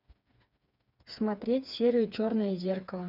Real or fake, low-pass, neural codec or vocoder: fake; 5.4 kHz; codec, 16 kHz, 4 kbps, FreqCodec, smaller model